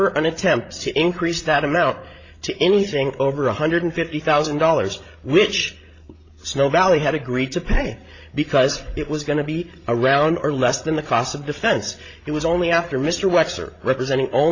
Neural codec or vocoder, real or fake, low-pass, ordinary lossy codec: codec, 16 kHz, 16 kbps, FreqCodec, larger model; fake; 7.2 kHz; AAC, 32 kbps